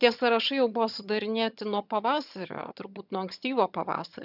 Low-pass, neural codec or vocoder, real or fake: 5.4 kHz; vocoder, 22.05 kHz, 80 mel bands, HiFi-GAN; fake